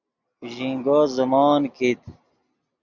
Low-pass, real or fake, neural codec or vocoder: 7.2 kHz; real; none